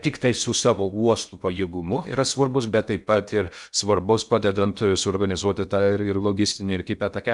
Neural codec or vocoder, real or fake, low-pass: codec, 16 kHz in and 24 kHz out, 0.6 kbps, FocalCodec, streaming, 4096 codes; fake; 10.8 kHz